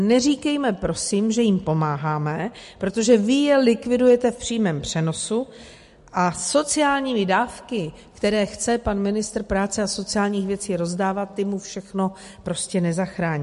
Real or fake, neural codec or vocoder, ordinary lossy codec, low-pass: real; none; MP3, 48 kbps; 14.4 kHz